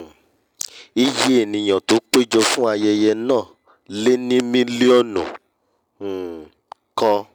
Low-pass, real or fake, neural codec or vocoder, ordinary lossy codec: 19.8 kHz; real; none; none